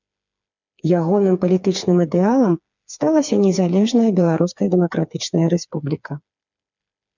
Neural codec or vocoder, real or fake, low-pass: codec, 16 kHz, 4 kbps, FreqCodec, smaller model; fake; 7.2 kHz